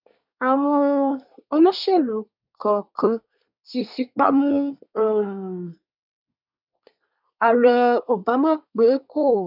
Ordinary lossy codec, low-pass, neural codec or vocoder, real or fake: none; 5.4 kHz; codec, 24 kHz, 1 kbps, SNAC; fake